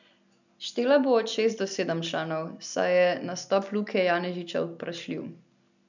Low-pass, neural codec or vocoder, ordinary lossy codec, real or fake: 7.2 kHz; none; none; real